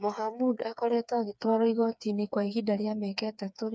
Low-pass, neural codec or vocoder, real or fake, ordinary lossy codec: none; codec, 16 kHz, 4 kbps, FreqCodec, smaller model; fake; none